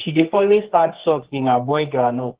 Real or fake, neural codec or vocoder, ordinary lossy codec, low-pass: fake; codec, 24 kHz, 0.9 kbps, WavTokenizer, medium music audio release; Opus, 16 kbps; 3.6 kHz